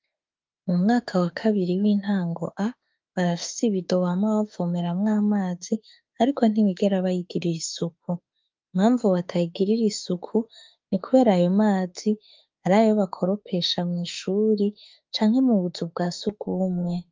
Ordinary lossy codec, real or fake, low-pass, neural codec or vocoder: Opus, 24 kbps; fake; 7.2 kHz; autoencoder, 48 kHz, 32 numbers a frame, DAC-VAE, trained on Japanese speech